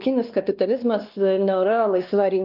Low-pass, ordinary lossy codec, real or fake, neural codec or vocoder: 5.4 kHz; Opus, 32 kbps; fake; codec, 16 kHz, 2 kbps, X-Codec, WavLM features, trained on Multilingual LibriSpeech